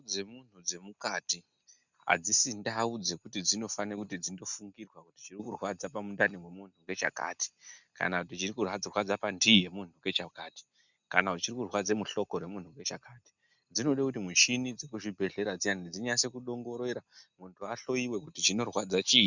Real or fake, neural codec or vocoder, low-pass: real; none; 7.2 kHz